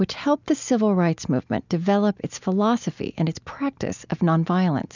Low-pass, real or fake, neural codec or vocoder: 7.2 kHz; real; none